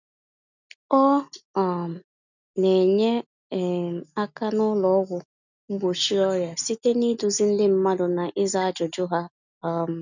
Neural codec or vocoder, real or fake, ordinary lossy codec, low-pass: none; real; none; 7.2 kHz